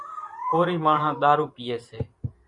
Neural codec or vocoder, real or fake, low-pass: vocoder, 44.1 kHz, 128 mel bands every 512 samples, BigVGAN v2; fake; 10.8 kHz